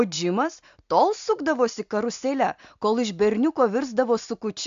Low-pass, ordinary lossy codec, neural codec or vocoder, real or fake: 7.2 kHz; AAC, 64 kbps; none; real